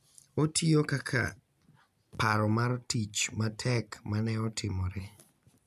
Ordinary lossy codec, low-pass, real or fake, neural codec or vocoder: none; 14.4 kHz; real; none